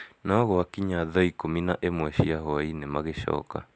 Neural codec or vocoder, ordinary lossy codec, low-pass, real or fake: none; none; none; real